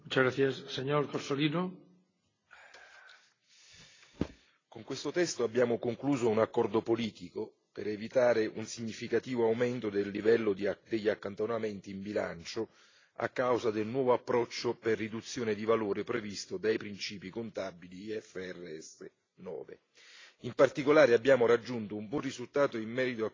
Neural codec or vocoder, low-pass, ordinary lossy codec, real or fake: none; 7.2 kHz; AAC, 32 kbps; real